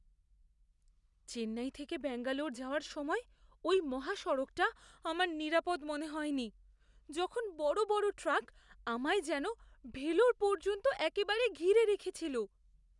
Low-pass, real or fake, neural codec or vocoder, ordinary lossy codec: 10.8 kHz; real; none; none